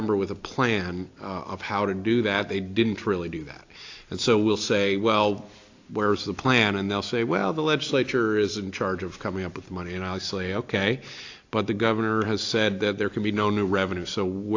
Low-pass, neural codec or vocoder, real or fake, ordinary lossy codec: 7.2 kHz; none; real; AAC, 48 kbps